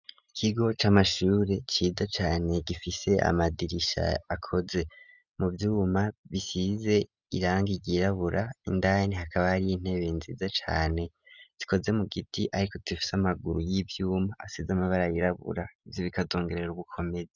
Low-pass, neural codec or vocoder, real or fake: 7.2 kHz; none; real